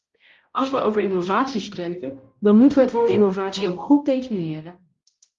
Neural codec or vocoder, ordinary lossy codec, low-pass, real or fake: codec, 16 kHz, 0.5 kbps, X-Codec, HuBERT features, trained on balanced general audio; Opus, 32 kbps; 7.2 kHz; fake